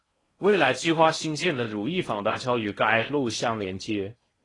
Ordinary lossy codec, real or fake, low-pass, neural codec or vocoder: AAC, 32 kbps; fake; 10.8 kHz; codec, 16 kHz in and 24 kHz out, 0.6 kbps, FocalCodec, streaming, 4096 codes